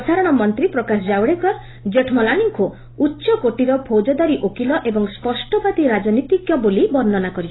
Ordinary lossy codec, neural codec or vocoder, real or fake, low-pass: AAC, 16 kbps; none; real; 7.2 kHz